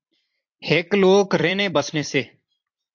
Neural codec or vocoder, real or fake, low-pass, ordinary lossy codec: vocoder, 44.1 kHz, 128 mel bands every 256 samples, BigVGAN v2; fake; 7.2 kHz; MP3, 48 kbps